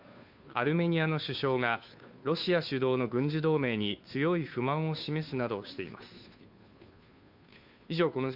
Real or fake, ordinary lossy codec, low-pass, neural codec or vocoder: fake; none; 5.4 kHz; codec, 16 kHz, 2 kbps, FunCodec, trained on Chinese and English, 25 frames a second